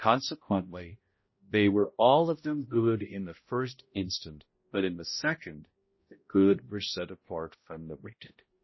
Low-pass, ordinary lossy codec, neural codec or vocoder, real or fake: 7.2 kHz; MP3, 24 kbps; codec, 16 kHz, 0.5 kbps, X-Codec, HuBERT features, trained on balanced general audio; fake